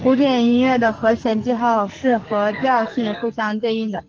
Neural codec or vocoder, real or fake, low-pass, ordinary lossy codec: codec, 44.1 kHz, 2.6 kbps, SNAC; fake; 7.2 kHz; Opus, 24 kbps